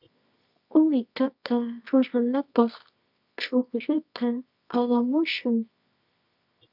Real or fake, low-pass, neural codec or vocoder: fake; 5.4 kHz; codec, 24 kHz, 0.9 kbps, WavTokenizer, medium music audio release